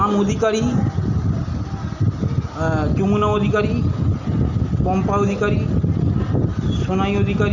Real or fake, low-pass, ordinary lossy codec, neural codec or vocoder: real; 7.2 kHz; none; none